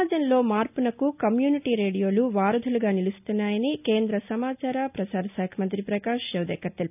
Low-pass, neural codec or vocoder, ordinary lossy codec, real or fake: 3.6 kHz; none; none; real